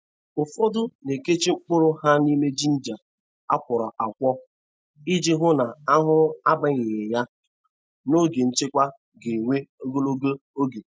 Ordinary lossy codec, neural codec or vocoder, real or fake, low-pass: none; none; real; none